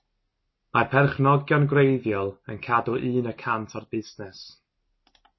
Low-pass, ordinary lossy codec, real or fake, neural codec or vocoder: 7.2 kHz; MP3, 24 kbps; real; none